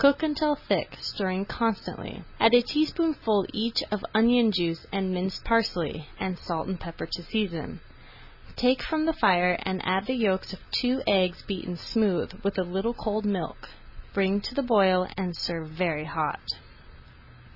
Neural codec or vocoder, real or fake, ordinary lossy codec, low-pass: none; real; AAC, 48 kbps; 5.4 kHz